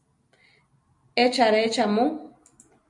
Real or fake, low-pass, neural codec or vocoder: real; 10.8 kHz; none